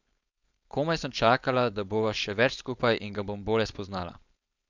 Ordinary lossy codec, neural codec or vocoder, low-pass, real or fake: none; codec, 16 kHz, 4.8 kbps, FACodec; 7.2 kHz; fake